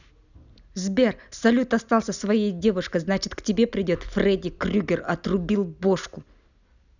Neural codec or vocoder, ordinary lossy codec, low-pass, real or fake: none; none; 7.2 kHz; real